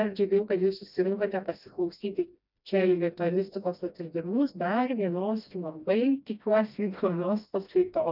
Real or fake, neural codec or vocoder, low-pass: fake; codec, 16 kHz, 1 kbps, FreqCodec, smaller model; 5.4 kHz